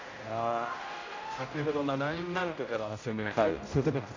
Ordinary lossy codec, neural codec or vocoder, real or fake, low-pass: AAC, 32 kbps; codec, 16 kHz, 0.5 kbps, X-Codec, HuBERT features, trained on general audio; fake; 7.2 kHz